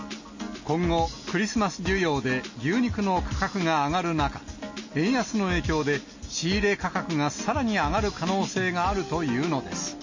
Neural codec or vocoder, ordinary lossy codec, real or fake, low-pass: none; MP3, 32 kbps; real; 7.2 kHz